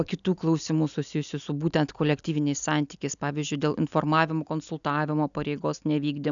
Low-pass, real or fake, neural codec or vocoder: 7.2 kHz; real; none